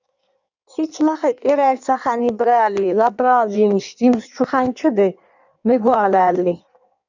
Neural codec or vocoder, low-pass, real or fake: codec, 16 kHz in and 24 kHz out, 1.1 kbps, FireRedTTS-2 codec; 7.2 kHz; fake